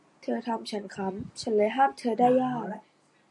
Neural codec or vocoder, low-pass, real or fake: none; 10.8 kHz; real